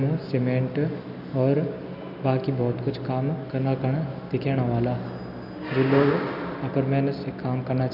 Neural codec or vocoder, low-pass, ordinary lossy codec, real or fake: none; 5.4 kHz; none; real